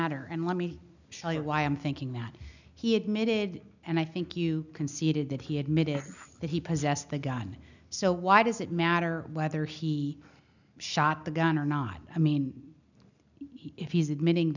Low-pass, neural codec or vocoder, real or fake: 7.2 kHz; none; real